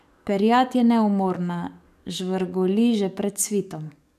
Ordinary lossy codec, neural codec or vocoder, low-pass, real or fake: none; codec, 44.1 kHz, 7.8 kbps, DAC; 14.4 kHz; fake